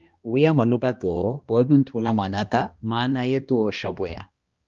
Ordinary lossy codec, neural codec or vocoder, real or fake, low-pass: Opus, 32 kbps; codec, 16 kHz, 1 kbps, X-Codec, HuBERT features, trained on balanced general audio; fake; 7.2 kHz